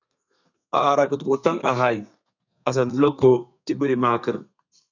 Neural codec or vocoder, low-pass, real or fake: codec, 32 kHz, 1.9 kbps, SNAC; 7.2 kHz; fake